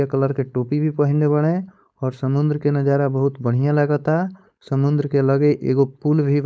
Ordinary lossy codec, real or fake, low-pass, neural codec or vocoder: none; fake; none; codec, 16 kHz, 4.8 kbps, FACodec